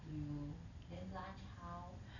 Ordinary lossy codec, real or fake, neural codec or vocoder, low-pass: none; real; none; 7.2 kHz